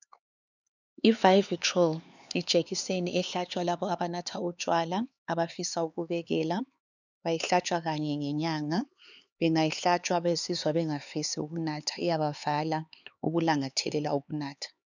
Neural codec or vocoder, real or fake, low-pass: codec, 16 kHz, 4 kbps, X-Codec, HuBERT features, trained on LibriSpeech; fake; 7.2 kHz